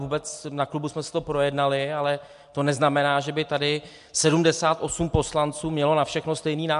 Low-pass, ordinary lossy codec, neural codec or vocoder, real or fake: 10.8 kHz; MP3, 64 kbps; none; real